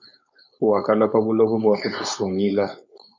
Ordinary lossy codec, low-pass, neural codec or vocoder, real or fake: MP3, 64 kbps; 7.2 kHz; codec, 16 kHz, 4.8 kbps, FACodec; fake